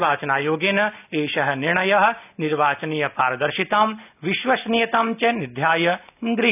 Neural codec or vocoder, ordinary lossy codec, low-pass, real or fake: none; none; 3.6 kHz; real